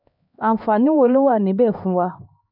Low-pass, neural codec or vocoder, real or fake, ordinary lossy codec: 5.4 kHz; codec, 16 kHz, 2 kbps, X-Codec, HuBERT features, trained on LibriSpeech; fake; none